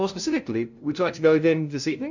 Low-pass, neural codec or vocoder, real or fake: 7.2 kHz; codec, 16 kHz, 0.5 kbps, FunCodec, trained on LibriTTS, 25 frames a second; fake